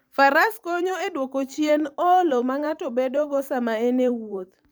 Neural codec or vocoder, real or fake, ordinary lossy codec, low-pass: vocoder, 44.1 kHz, 128 mel bands every 512 samples, BigVGAN v2; fake; none; none